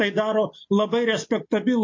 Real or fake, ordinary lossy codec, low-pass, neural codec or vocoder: real; MP3, 32 kbps; 7.2 kHz; none